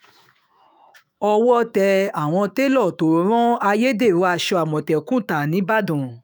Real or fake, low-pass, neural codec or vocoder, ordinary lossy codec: fake; none; autoencoder, 48 kHz, 128 numbers a frame, DAC-VAE, trained on Japanese speech; none